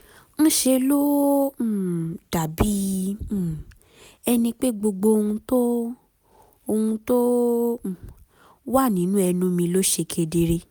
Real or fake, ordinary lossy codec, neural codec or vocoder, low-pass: real; none; none; none